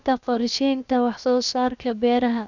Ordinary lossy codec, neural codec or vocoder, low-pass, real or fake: none; codec, 16 kHz, 0.7 kbps, FocalCodec; 7.2 kHz; fake